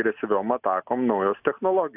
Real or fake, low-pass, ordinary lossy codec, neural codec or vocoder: real; 3.6 kHz; AAC, 32 kbps; none